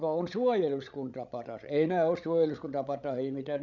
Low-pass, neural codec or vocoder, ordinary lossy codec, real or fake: 7.2 kHz; codec, 16 kHz, 16 kbps, FreqCodec, larger model; none; fake